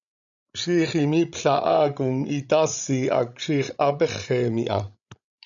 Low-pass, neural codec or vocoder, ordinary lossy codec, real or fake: 7.2 kHz; codec, 16 kHz, 16 kbps, FreqCodec, larger model; MP3, 96 kbps; fake